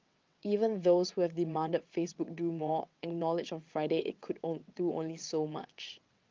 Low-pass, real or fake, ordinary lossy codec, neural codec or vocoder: 7.2 kHz; fake; Opus, 24 kbps; vocoder, 22.05 kHz, 80 mel bands, WaveNeXt